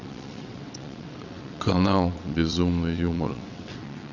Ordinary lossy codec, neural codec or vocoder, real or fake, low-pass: none; vocoder, 22.05 kHz, 80 mel bands, WaveNeXt; fake; 7.2 kHz